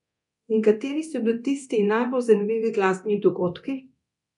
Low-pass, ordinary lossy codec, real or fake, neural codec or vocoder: 10.8 kHz; none; fake; codec, 24 kHz, 0.9 kbps, DualCodec